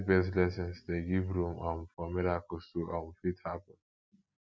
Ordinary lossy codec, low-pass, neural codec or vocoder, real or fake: none; none; none; real